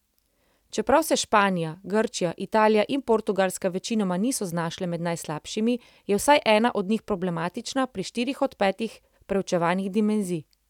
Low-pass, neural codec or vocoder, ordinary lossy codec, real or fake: 19.8 kHz; none; none; real